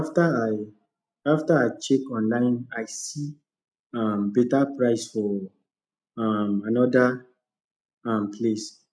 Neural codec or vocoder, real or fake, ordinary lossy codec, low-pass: none; real; none; none